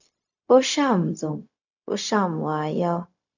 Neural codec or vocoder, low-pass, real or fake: codec, 16 kHz, 0.4 kbps, LongCat-Audio-Codec; 7.2 kHz; fake